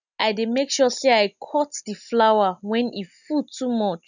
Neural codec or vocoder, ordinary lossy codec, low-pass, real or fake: none; none; 7.2 kHz; real